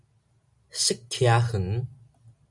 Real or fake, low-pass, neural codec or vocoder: real; 10.8 kHz; none